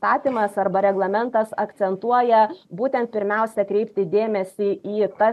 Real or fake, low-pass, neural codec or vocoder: real; 14.4 kHz; none